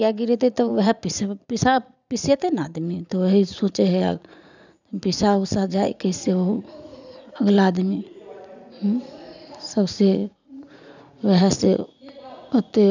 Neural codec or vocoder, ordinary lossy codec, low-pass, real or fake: vocoder, 44.1 kHz, 80 mel bands, Vocos; none; 7.2 kHz; fake